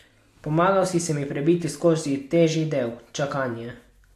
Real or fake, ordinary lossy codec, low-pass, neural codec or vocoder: real; AAC, 64 kbps; 14.4 kHz; none